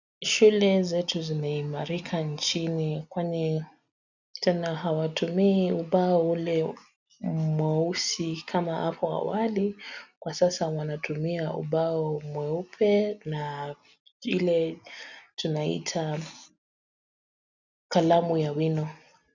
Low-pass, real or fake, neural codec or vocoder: 7.2 kHz; real; none